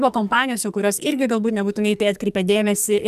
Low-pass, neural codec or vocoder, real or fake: 14.4 kHz; codec, 44.1 kHz, 2.6 kbps, SNAC; fake